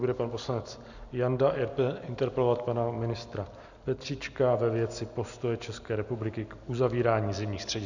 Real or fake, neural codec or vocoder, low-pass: real; none; 7.2 kHz